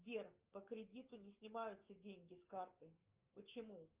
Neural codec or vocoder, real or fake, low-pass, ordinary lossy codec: autoencoder, 48 kHz, 128 numbers a frame, DAC-VAE, trained on Japanese speech; fake; 3.6 kHz; Opus, 24 kbps